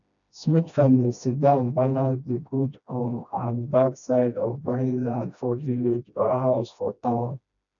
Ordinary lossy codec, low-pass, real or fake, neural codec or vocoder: none; 7.2 kHz; fake; codec, 16 kHz, 1 kbps, FreqCodec, smaller model